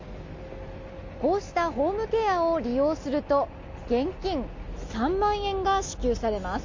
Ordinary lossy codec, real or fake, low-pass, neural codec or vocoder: MP3, 48 kbps; real; 7.2 kHz; none